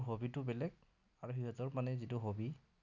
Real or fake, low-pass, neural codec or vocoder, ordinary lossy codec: real; 7.2 kHz; none; none